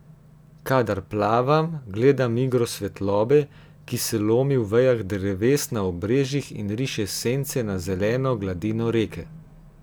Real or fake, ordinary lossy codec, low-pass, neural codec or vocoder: real; none; none; none